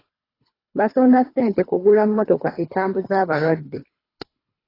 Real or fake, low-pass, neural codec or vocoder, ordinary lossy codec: fake; 5.4 kHz; codec, 24 kHz, 3 kbps, HILCodec; AAC, 24 kbps